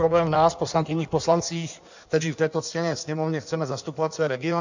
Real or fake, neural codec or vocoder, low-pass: fake; codec, 16 kHz in and 24 kHz out, 1.1 kbps, FireRedTTS-2 codec; 7.2 kHz